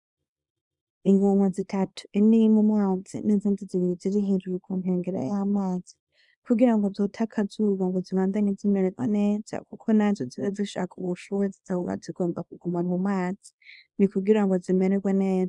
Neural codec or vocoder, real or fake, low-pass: codec, 24 kHz, 0.9 kbps, WavTokenizer, small release; fake; 10.8 kHz